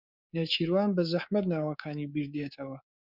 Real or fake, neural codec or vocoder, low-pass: fake; codec, 24 kHz, 3.1 kbps, DualCodec; 5.4 kHz